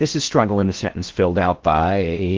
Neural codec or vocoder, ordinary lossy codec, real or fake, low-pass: codec, 16 kHz in and 24 kHz out, 0.6 kbps, FocalCodec, streaming, 4096 codes; Opus, 16 kbps; fake; 7.2 kHz